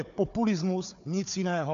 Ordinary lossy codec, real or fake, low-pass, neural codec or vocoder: MP3, 64 kbps; fake; 7.2 kHz; codec, 16 kHz, 4 kbps, FunCodec, trained on Chinese and English, 50 frames a second